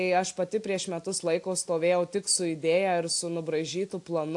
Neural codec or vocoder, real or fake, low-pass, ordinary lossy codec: none; real; 10.8 kHz; AAC, 64 kbps